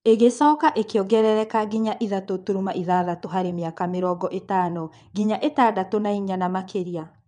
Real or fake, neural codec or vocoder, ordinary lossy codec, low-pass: fake; vocoder, 22.05 kHz, 80 mel bands, WaveNeXt; none; 9.9 kHz